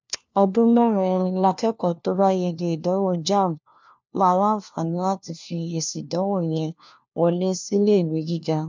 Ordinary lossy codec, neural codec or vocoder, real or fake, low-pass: MP3, 64 kbps; codec, 16 kHz, 1 kbps, FunCodec, trained on LibriTTS, 50 frames a second; fake; 7.2 kHz